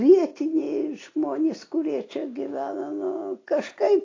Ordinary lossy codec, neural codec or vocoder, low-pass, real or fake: AAC, 32 kbps; none; 7.2 kHz; real